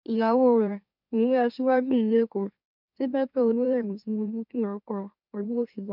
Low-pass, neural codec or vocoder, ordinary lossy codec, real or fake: 5.4 kHz; autoencoder, 44.1 kHz, a latent of 192 numbers a frame, MeloTTS; none; fake